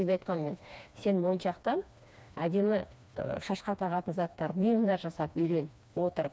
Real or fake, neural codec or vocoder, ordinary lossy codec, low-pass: fake; codec, 16 kHz, 2 kbps, FreqCodec, smaller model; none; none